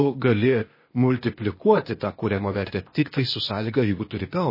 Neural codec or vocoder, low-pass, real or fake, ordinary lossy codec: codec, 16 kHz, 0.8 kbps, ZipCodec; 5.4 kHz; fake; MP3, 24 kbps